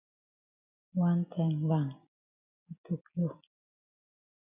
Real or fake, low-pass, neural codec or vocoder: real; 3.6 kHz; none